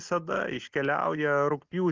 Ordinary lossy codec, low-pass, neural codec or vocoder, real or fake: Opus, 24 kbps; 7.2 kHz; none; real